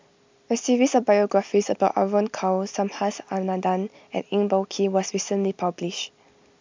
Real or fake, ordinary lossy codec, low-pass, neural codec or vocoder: real; MP3, 64 kbps; 7.2 kHz; none